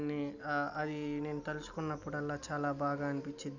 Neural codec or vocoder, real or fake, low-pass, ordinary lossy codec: none; real; 7.2 kHz; none